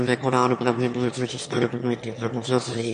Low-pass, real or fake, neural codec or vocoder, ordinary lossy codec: 9.9 kHz; fake; autoencoder, 22.05 kHz, a latent of 192 numbers a frame, VITS, trained on one speaker; MP3, 48 kbps